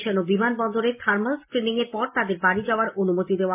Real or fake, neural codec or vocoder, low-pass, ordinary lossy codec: real; none; 3.6 kHz; MP3, 24 kbps